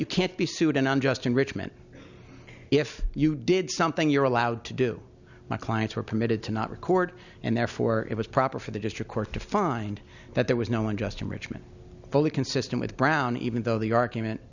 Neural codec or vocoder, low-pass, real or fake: none; 7.2 kHz; real